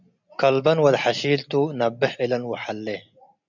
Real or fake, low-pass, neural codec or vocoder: real; 7.2 kHz; none